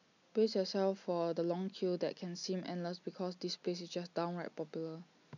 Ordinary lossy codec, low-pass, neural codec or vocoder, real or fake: none; 7.2 kHz; none; real